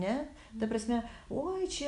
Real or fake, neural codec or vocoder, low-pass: real; none; 10.8 kHz